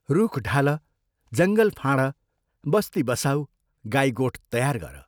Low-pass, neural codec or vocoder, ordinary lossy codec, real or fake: none; none; none; real